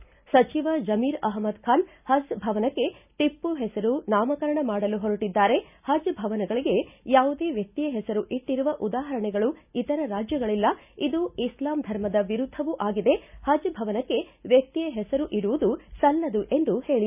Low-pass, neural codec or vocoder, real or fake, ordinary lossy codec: 3.6 kHz; none; real; none